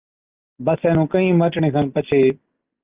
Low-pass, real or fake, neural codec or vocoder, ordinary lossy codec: 3.6 kHz; real; none; Opus, 24 kbps